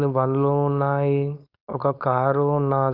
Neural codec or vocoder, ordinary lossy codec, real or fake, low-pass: codec, 16 kHz, 4.8 kbps, FACodec; none; fake; 5.4 kHz